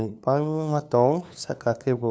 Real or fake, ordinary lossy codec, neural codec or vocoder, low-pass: fake; none; codec, 16 kHz, 2 kbps, FunCodec, trained on LibriTTS, 25 frames a second; none